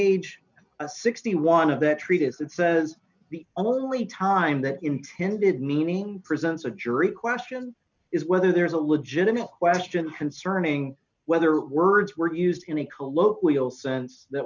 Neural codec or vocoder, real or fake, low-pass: none; real; 7.2 kHz